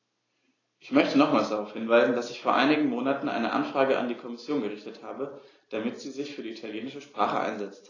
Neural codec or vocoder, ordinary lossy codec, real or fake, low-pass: none; AAC, 32 kbps; real; 7.2 kHz